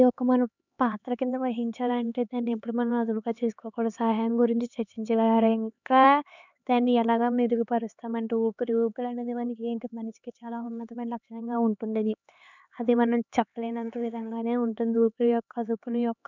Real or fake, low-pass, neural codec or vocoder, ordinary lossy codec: fake; 7.2 kHz; codec, 16 kHz, 4 kbps, X-Codec, HuBERT features, trained on LibriSpeech; none